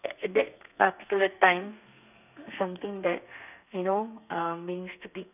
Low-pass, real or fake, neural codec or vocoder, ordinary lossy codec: 3.6 kHz; fake; codec, 32 kHz, 1.9 kbps, SNAC; none